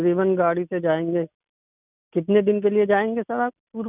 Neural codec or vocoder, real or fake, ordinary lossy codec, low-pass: none; real; none; 3.6 kHz